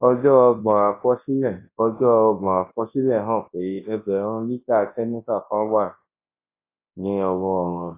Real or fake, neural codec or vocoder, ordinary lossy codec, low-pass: fake; codec, 24 kHz, 0.9 kbps, WavTokenizer, large speech release; AAC, 24 kbps; 3.6 kHz